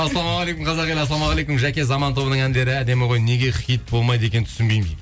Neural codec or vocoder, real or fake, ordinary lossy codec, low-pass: none; real; none; none